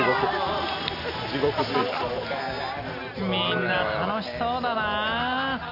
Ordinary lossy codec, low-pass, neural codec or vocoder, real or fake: none; 5.4 kHz; none; real